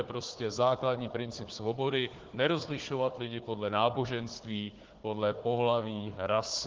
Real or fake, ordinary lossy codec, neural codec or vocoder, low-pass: fake; Opus, 16 kbps; codec, 16 kHz, 4 kbps, FunCodec, trained on Chinese and English, 50 frames a second; 7.2 kHz